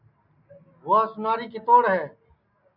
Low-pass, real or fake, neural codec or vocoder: 5.4 kHz; real; none